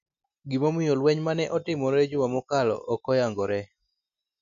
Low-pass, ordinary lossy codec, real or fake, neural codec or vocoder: 7.2 kHz; none; real; none